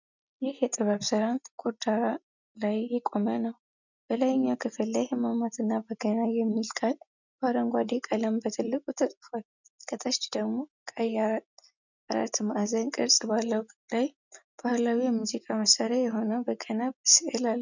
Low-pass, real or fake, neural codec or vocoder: 7.2 kHz; real; none